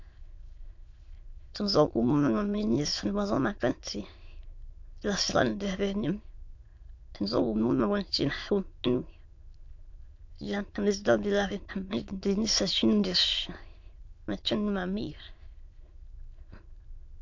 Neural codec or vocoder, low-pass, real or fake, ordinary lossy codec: autoencoder, 22.05 kHz, a latent of 192 numbers a frame, VITS, trained on many speakers; 7.2 kHz; fake; MP3, 48 kbps